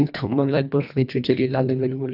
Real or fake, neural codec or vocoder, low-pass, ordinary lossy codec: fake; codec, 24 kHz, 1.5 kbps, HILCodec; 5.4 kHz; none